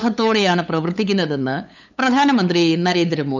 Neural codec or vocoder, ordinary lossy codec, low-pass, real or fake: codec, 16 kHz, 8 kbps, FunCodec, trained on LibriTTS, 25 frames a second; none; 7.2 kHz; fake